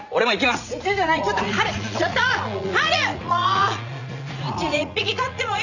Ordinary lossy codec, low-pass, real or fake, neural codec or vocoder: none; 7.2 kHz; fake; vocoder, 44.1 kHz, 80 mel bands, Vocos